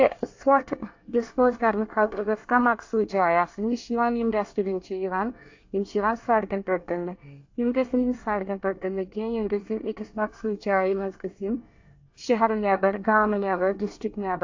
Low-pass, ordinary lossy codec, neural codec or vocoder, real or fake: 7.2 kHz; AAC, 48 kbps; codec, 24 kHz, 1 kbps, SNAC; fake